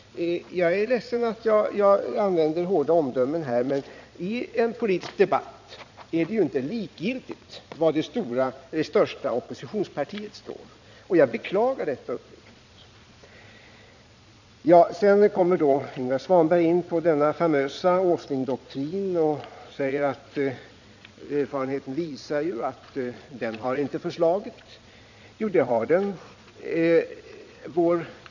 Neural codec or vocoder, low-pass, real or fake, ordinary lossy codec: vocoder, 22.05 kHz, 80 mel bands, Vocos; 7.2 kHz; fake; none